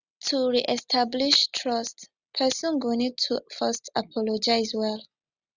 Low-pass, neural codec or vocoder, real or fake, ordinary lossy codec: 7.2 kHz; none; real; Opus, 64 kbps